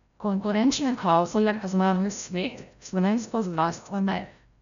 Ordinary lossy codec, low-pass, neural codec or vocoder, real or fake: none; 7.2 kHz; codec, 16 kHz, 0.5 kbps, FreqCodec, larger model; fake